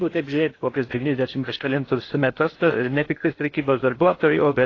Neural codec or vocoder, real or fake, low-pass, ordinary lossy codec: codec, 16 kHz in and 24 kHz out, 0.6 kbps, FocalCodec, streaming, 2048 codes; fake; 7.2 kHz; AAC, 32 kbps